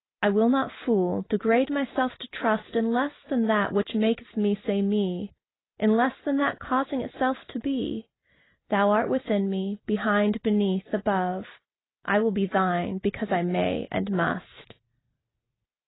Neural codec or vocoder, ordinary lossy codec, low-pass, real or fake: none; AAC, 16 kbps; 7.2 kHz; real